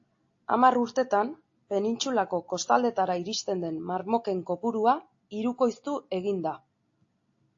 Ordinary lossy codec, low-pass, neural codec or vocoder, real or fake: AAC, 48 kbps; 7.2 kHz; none; real